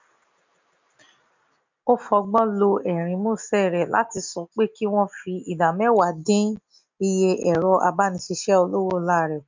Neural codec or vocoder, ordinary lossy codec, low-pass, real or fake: none; MP3, 64 kbps; 7.2 kHz; real